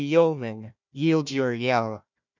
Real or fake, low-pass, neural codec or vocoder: fake; 7.2 kHz; codec, 16 kHz, 1 kbps, FunCodec, trained on Chinese and English, 50 frames a second